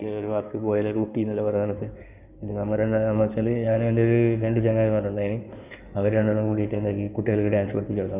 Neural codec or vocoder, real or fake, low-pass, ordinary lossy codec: codec, 16 kHz in and 24 kHz out, 2.2 kbps, FireRedTTS-2 codec; fake; 3.6 kHz; none